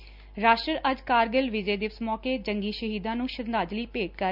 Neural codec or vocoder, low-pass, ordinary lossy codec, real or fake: none; 5.4 kHz; none; real